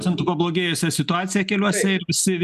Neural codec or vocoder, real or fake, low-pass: none; real; 14.4 kHz